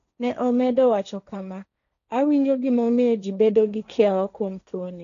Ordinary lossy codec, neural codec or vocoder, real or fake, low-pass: none; codec, 16 kHz, 1.1 kbps, Voila-Tokenizer; fake; 7.2 kHz